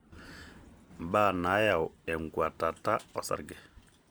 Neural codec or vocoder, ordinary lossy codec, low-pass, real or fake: none; none; none; real